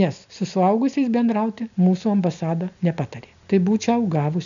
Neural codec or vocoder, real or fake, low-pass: none; real; 7.2 kHz